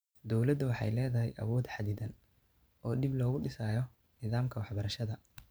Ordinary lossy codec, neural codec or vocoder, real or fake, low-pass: none; vocoder, 44.1 kHz, 128 mel bands every 512 samples, BigVGAN v2; fake; none